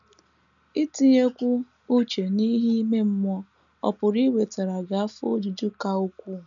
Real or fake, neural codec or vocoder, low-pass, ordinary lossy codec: real; none; 7.2 kHz; none